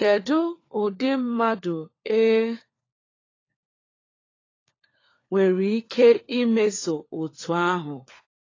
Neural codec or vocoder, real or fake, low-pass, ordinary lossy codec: codec, 16 kHz, 4 kbps, FunCodec, trained on LibriTTS, 50 frames a second; fake; 7.2 kHz; AAC, 32 kbps